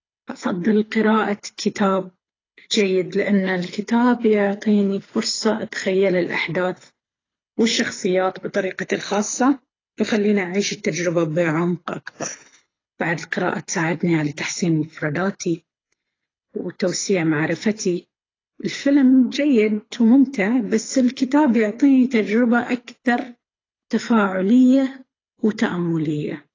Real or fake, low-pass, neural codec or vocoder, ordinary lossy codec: fake; 7.2 kHz; codec, 24 kHz, 6 kbps, HILCodec; AAC, 32 kbps